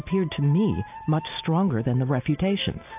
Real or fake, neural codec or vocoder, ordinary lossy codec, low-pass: real; none; AAC, 32 kbps; 3.6 kHz